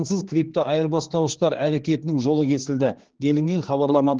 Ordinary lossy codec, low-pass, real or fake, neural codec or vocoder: Opus, 16 kbps; 7.2 kHz; fake; codec, 16 kHz, 2 kbps, X-Codec, HuBERT features, trained on general audio